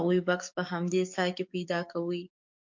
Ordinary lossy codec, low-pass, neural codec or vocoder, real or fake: MP3, 64 kbps; 7.2 kHz; codec, 44.1 kHz, 7.8 kbps, DAC; fake